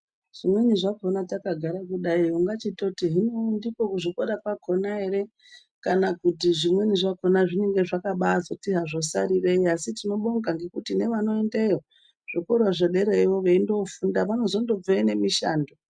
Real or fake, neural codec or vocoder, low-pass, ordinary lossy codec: real; none; 9.9 kHz; MP3, 96 kbps